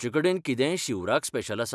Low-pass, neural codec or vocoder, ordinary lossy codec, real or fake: none; none; none; real